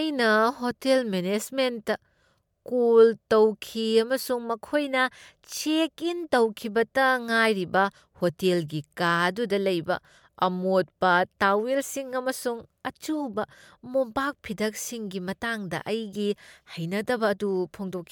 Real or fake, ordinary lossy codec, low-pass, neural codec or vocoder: real; MP3, 96 kbps; 19.8 kHz; none